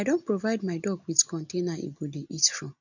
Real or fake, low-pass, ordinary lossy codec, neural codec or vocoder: real; 7.2 kHz; none; none